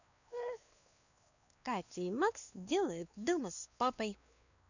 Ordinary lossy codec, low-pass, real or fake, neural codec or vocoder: none; 7.2 kHz; fake; codec, 16 kHz, 2 kbps, X-Codec, WavLM features, trained on Multilingual LibriSpeech